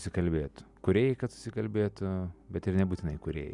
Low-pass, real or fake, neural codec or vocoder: 10.8 kHz; real; none